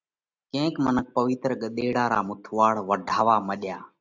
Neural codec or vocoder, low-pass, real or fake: none; 7.2 kHz; real